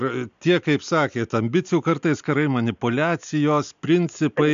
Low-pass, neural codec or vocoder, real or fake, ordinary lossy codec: 7.2 kHz; none; real; MP3, 64 kbps